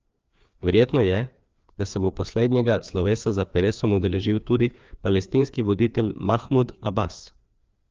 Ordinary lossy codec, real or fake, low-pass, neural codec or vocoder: Opus, 24 kbps; fake; 7.2 kHz; codec, 16 kHz, 2 kbps, FreqCodec, larger model